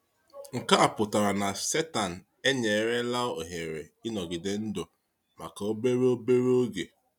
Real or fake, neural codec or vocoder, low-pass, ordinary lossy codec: real; none; 19.8 kHz; none